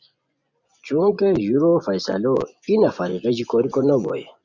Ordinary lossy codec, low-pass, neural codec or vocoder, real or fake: Opus, 64 kbps; 7.2 kHz; vocoder, 24 kHz, 100 mel bands, Vocos; fake